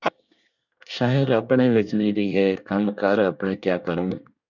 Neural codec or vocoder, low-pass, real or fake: codec, 24 kHz, 1 kbps, SNAC; 7.2 kHz; fake